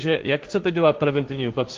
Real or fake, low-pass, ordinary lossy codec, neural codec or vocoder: fake; 7.2 kHz; Opus, 24 kbps; codec, 16 kHz, 1.1 kbps, Voila-Tokenizer